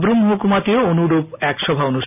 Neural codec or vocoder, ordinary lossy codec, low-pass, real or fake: none; none; 3.6 kHz; real